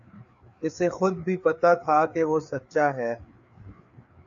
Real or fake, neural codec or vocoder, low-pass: fake; codec, 16 kHz, 4 kbps, FreqCodec, larger model; 7.2 kHz